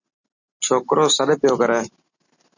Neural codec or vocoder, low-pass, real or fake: none; 7.2 kHz; real